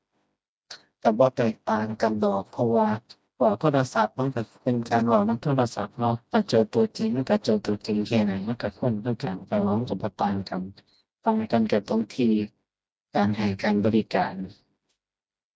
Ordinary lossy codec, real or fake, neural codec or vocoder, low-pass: none; fake; codec, 16 kHz, 1 kbps, FreqCodec, smaller model; none